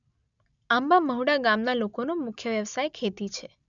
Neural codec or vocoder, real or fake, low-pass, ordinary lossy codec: none; real; 7.2 kHz; none